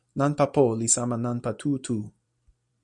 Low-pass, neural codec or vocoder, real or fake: 10.8 kHz; vocoder, 44.1 kHz, 128 mel bands every 256 samples, BigVGAN v2; fake